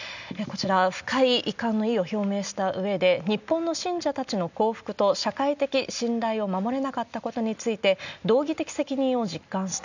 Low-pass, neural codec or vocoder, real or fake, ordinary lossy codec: 7.2 kHz; none; real; none